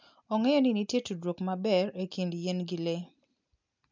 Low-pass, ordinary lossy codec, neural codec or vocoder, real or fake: 7.2 kHz; MP3, 64 kbps; none; real